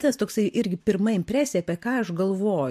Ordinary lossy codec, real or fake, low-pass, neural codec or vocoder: MP3, 64 kbps; fake; 14.4 kHz; vocoder, 44.1 kHz, 128 mel bands every 512 samples, BigVGAN v2